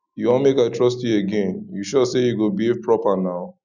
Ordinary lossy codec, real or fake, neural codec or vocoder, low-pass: none; real; none; 7.2 kHz